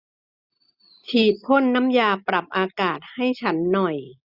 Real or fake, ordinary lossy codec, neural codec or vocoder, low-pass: real; none; none; 5.4 kHz